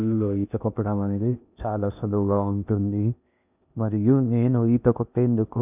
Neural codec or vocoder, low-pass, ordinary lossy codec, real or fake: codec, 16 kHz in and 24 kHz out, 0.6 kbps, FocalCodec, streaming, 2048 codes; 3.6 kHz; none; fake